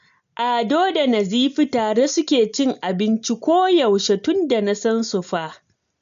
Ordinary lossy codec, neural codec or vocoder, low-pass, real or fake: MP3, 64 kbps; none; 7.2 kHz; real